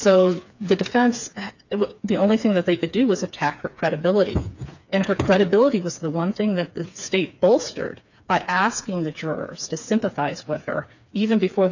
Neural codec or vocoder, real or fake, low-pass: codec, 16 kHz, 4 kbps, FreqCodec, smaller model; fake; 7.2 kHz